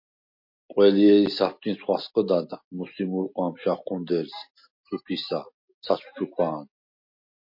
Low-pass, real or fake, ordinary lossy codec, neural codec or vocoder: 5.4 kHz; real; MP3, 32 kbps; none